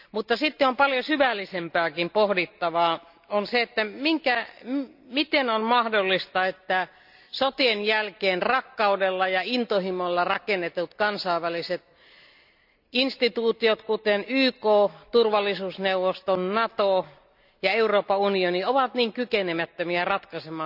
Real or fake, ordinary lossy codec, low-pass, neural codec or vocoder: real; none; 5.4 kHz; none